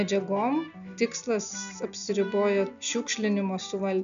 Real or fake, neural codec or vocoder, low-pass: real; none; 7.2 kHz